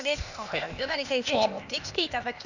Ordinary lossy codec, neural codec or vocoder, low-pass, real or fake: none; codec, 16 kHz, 0.8 kbps, ZipCodec; 7.2 kHz; fake